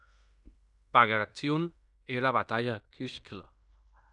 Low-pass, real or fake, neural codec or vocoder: 10.8 kHz; fake; codec, 16 kHz in and 24 kHz out, 0.9 kbps, LongCat-Audio-Codec, fine tuned four codebook decoder